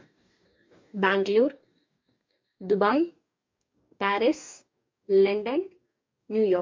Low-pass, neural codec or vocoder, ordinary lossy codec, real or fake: 7.2 kHz; codec, 44.1 kHz, 2.6 kbps, DAC; MP3, 64 kbps; fake